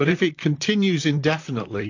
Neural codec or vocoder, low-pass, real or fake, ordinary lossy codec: vocoder, 44.1 kHz, 128 mel bands, Pupu-Vocoder; 7.2 kHz; fake; AAC, 48 kbps